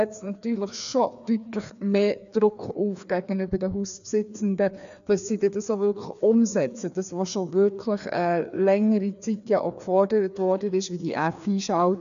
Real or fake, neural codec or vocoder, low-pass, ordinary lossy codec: fake; codec, 16 kHz, 2 kbps, FreqCodec, larger model; 7.2 kHz; none